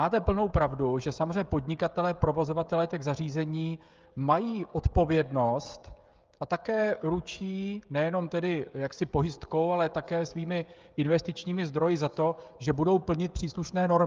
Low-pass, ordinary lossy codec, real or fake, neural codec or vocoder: 7.2 kHz; Opus, 24 kbps; fake; codec, 16 kHz, 16 kbps, FreqCodec, smaller model